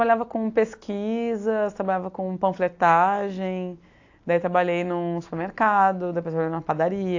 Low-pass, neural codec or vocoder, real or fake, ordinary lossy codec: 7.2 kHz; none; real; AAC, 48 kbps